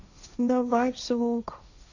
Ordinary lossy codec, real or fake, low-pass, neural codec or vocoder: none; fake; 7.2 kHz; codec, 16 kHz, 1.1 kbps, Voila-Tokenizer